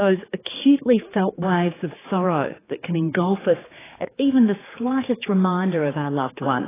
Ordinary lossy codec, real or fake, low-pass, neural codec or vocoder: AAC, 16 kbps; fake; 3.6 kHz; codec, 16 kHz, 4 kbps, X-Codec, HuBERT features, trained on general audio